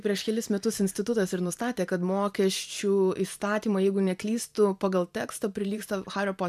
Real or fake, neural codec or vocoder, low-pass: fake; vocoder, 44.1 kHz, 128 mel bands every 512 samples, BigVGAN v2; 14.4 kHz